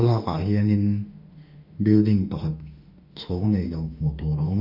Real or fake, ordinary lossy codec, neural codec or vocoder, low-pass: fake; Opus, 64 kbps; autoencoder, 48 kHz, 32 numbers a frame, DAC-VAE, trained on Japanese speech; 5.4 kHz